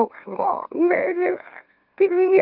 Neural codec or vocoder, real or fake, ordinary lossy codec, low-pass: autoencoder, 44.1 kHz, a latent of 192 numbers a frame, MeloTTS; fake; Opus, 24 kbps; 5.4 kHz